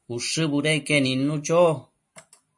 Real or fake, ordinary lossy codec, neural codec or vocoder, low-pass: real; MP3, 48 kbps; none; 10.8 kHz